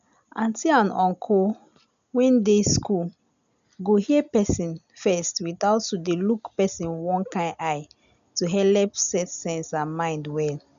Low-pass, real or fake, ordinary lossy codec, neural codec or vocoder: 7.2 kHz; real; none; none